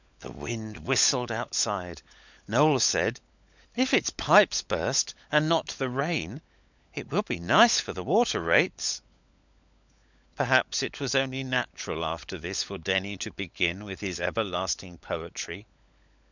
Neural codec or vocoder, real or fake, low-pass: codec, 16 kHz, 16 kbps, FunCodec, trained on LibriTTS, 50 frames a second; fake; 7.2 kHz